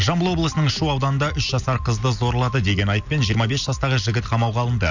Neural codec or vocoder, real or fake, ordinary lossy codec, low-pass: none; real; none; 7.2 kHz